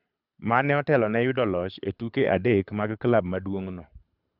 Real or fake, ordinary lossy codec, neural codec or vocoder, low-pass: fake; none; codec, 24 kHz, 6 kbps, HILCodec; 5.4 kHz